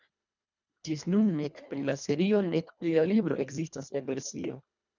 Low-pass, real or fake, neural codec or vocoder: 7.2 kHz; fake; codec, 24 kHz, 1.5 kbps, HILCodec